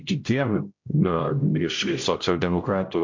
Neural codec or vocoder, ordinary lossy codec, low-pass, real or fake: codec, 16 kHz, 0.5 kbps, X-Codec, HuBERT features, trained on general audio; MP3, 48 kbps; 7.2 kHz; fake